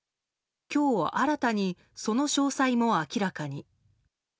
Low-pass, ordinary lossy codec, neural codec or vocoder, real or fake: none; none; none; real